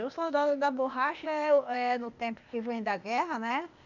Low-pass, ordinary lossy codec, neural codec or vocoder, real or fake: 7.2 kHz; none; codec, 16 kHz, 0.8 kbps, ZipCodec; fake